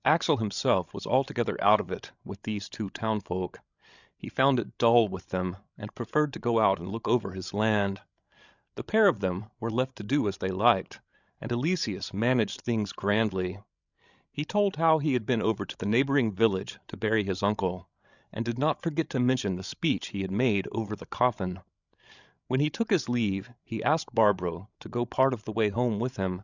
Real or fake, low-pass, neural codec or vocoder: fake; 7.2 kHz; codec, 16 kHz, 16 kbps, FreqCodec, larger model